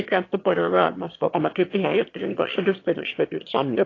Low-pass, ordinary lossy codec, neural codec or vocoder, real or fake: 7.2 kHz; AAC, 32 kbps; autoencoder, 22.05 kHz, a latent of 192 numbers a frame, VITS, trained on one speaker; fake